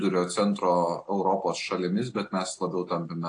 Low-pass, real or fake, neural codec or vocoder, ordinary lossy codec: 9.9 kHz; real; none; AAC, 48 kbps